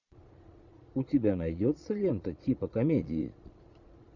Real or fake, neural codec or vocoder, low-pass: fake; vocoder, 22.05 kHz, 80 mel bands, Vocos; 7.2 kHz